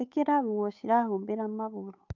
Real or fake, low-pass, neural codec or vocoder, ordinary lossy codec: fake; 7.2 kHz; codec, 16 kHz, 8 kbps, FunCodec, trained on Chinese and English, 25 frames a second; AAC, 48 kbps